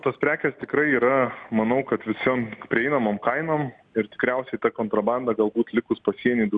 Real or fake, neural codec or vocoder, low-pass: real; none; 9.9 kHz